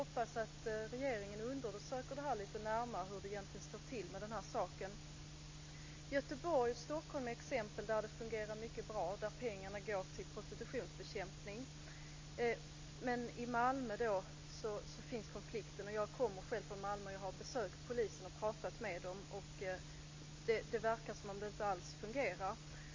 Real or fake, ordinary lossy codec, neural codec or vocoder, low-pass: real; MP3, 32 kbps; none; 7.2 kHz